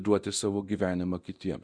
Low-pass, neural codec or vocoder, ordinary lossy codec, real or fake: 9.9 kHz; codec, 24 kHz, 0.9 kbps, DualCodec; Opus, 64 kbps; fake